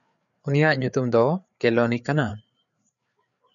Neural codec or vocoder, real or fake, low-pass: codec, 16 kHz, 4 kbps, FreqCodec, larger model; fake; 7.2 kHz